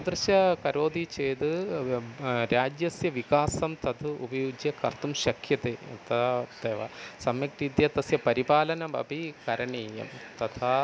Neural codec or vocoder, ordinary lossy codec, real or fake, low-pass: none; none; real; none